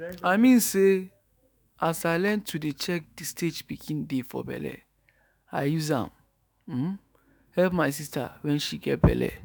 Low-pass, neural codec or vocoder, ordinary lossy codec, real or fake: none; autoencoder, 48 kHz, 128 numbers a frame, DAC-VAE, trained on Japanese speech; none; fake